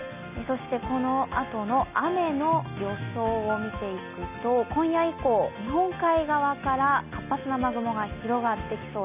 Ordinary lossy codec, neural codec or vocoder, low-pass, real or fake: none; none; 3.6 kHz; real